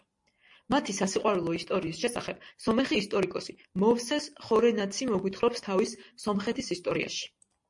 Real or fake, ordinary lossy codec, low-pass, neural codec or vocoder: real; MP3, 48 kbps; 10.8 kHz; none